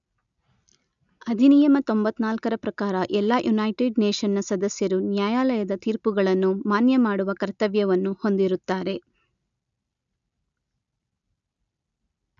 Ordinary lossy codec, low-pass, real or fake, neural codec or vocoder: none; 7.2 kHz; real; none